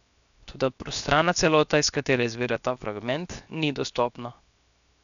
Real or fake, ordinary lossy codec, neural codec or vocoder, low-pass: fake; none; codec, 16 kHz, 0.7 kbps, FocalCodec; 7.2 kHz